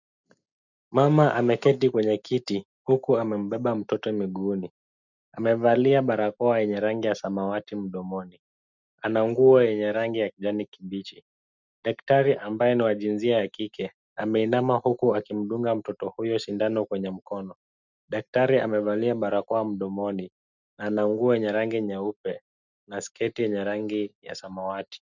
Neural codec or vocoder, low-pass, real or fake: none; 7.2 kHz; real